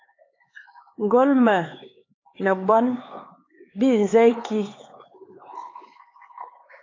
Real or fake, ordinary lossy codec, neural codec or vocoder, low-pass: fake; AAC, 32 kbps; codec, 16 kHz, 4 kbps, X-Codec, HuBERT features, trained on LibriSpeech; 7.2 kHz